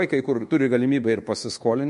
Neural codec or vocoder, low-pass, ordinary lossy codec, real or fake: codec, 24 kHz, 1.2 kbps, DualCodec; 10.8 kHz; MP3, 48 kbps; fake